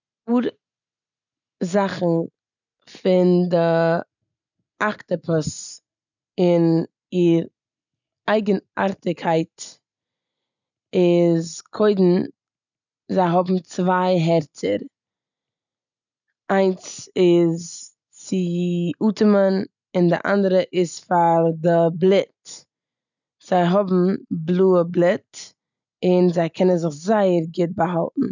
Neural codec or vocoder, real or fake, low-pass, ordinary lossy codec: none; real; 7.2 kHz; none